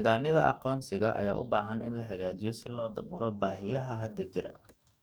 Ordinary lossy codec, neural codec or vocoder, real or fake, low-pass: none; codec, 44.1 kHz, 2.6 kbps, DAC; fake; none